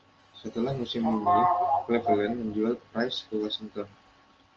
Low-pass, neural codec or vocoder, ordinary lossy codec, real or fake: 7.2 kHz; none; Opus, 24 kbps; real